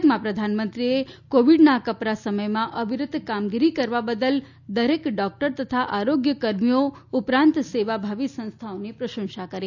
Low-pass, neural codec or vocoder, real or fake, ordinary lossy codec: 7.2 kHz; none; real; none